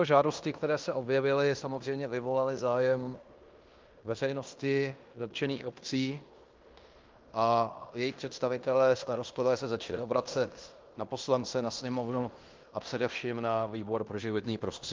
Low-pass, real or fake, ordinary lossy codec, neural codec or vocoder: 7.2 kHz; fake; Opus, 24 kbps; codec, 16 kHz in and 24 kHz out, 0.9 kbps, LongCat-Audio-Codec, fine tuned four codebook decoder